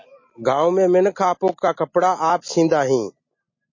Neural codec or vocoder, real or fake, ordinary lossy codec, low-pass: none; real; MP3, 32 kbps; 7.2 kHz